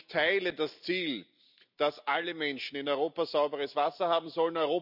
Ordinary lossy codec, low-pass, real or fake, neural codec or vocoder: none; 5.4 kHz; real; none